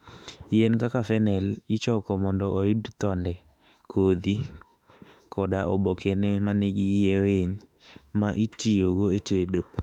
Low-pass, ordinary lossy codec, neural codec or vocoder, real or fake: 19.8 kHz; none; autoencoder, 48 kHz, 32 numbers a frame, DAC-VAE, trained on Japanese speech; fake